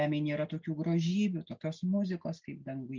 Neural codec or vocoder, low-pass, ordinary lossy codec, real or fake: none; 7.2 kHz; Opus, 32 kbps; real